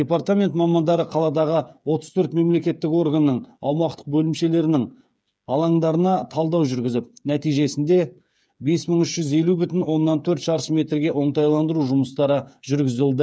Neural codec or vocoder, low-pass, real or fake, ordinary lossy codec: codec, 16 kHz, 8 kbps, FreqCodec, smaller model; none; fake; none